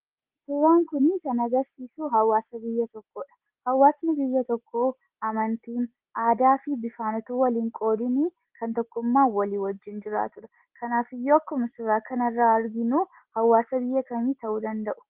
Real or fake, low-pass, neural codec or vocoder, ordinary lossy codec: real; 3.6 kHz; none; Opus, 16 kbps